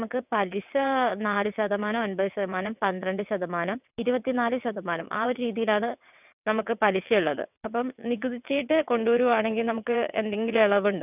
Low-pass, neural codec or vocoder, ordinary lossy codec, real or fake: 3.6 kHz; vocoder, 22.05 kHz, 80 mel bands, WaveNeXt; none; fake